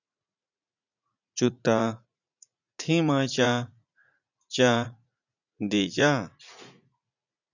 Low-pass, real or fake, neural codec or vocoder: 7.2 kHz; fake; vocoder, 44.1 kHz, 80 mel bands, Vocos